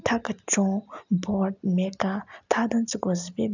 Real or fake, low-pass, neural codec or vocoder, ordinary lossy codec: real; 7.2 kHz; none; none